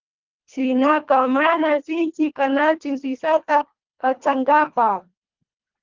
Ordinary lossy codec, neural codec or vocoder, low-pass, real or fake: Opus, 24 kbps; codec, 24 kHz, 1.5 kbps, HILCodec; 7.2 kHz; fake